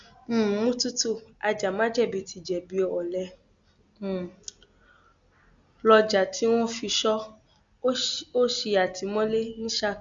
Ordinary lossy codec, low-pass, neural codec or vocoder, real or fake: Opus, 64 kbps; 7.2 kHz; none; real